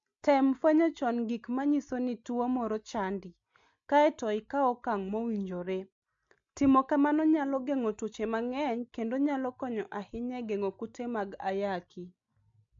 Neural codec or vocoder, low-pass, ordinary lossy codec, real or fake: none; 7.2 kHz; MP3, 48 kbps; real